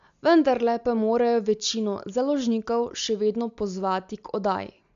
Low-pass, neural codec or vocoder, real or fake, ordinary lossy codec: 7.2 kHz; none; real; MP3, 64 kbps